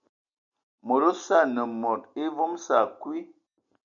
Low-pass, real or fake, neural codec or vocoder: 7.2 kHz; real; none